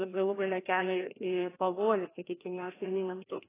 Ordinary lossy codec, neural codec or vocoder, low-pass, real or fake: AAC, 16 kbps; codec, 16 kHz, 1 kbps, FreqCodec, larger model; 3.6 kHz; fake